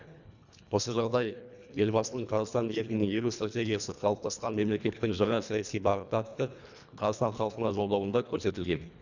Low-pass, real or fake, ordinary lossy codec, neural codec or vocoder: 7.2 kHz; fake; none; codec, 24 kHz, 1.5 kbps, HILCodec